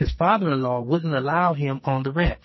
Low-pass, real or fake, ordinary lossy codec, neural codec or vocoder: 7.2 kHz; fake; MP3, 24 kbps; codec, 44.1 kHz, 2.6 kbps, SNAC